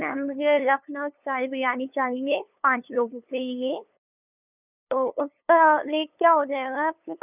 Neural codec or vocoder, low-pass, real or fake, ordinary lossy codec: codec, 16 kHz, 2 kbps, FunCodec, trained on LibriTTS, 25 frames a second; 3.6 kHz; fake; none